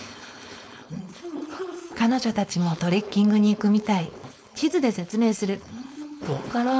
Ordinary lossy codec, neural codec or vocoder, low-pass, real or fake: none; codec, 16 kHz, 4.8 kbps, FACodec; none; fake